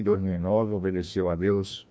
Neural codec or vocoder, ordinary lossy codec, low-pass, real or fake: codec, 16 kHz, 1 kbps, FreqCodec, larger model; none; none; fake